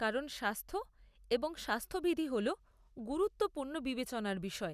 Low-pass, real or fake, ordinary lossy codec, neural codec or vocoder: 14.4 kHz; real; none; none